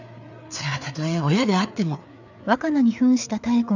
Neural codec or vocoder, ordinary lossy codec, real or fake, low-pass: codec, 16 kHz, 4 kbps, FreqCodec, larger model; none; fake; 7.2 kHz